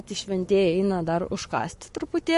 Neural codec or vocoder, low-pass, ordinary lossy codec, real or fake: codec, 44.1 kHz, 7.8 kbps, Pupu-Codec; 14.4 kHz; MP3, 48 kbps; fake